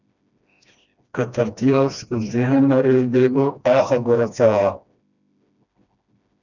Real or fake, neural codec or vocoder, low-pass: fake; codec, 16 kHz, 1 kbps, FreqCodec, smaller model; 7.2 kHz